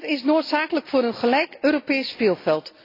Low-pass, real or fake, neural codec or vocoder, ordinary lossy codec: 5.4 kHz; real; none; AAC, 24 kbps